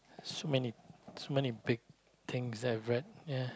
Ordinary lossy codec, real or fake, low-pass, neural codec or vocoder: none; real; none; none